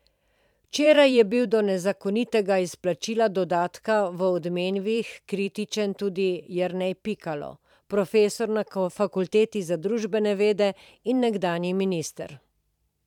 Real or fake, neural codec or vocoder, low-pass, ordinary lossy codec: fake; vocoder, 44.1 kHz, 128 mel bands every 512 samples, BigVGAN v2; 19.8 kHz; none